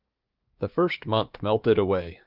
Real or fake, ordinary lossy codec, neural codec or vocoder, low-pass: fake; Opus, 32 kbps; codec, 16 kHz, 6 kbps, DAC; 5.4 kHz